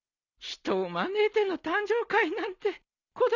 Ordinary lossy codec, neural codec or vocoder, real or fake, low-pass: none; none; real; 7.2 kHz